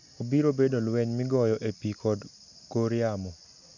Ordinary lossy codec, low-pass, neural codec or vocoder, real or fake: none; 7.2 kHz; none; real